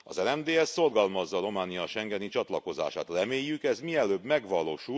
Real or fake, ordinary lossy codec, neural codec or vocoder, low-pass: real; none; none; none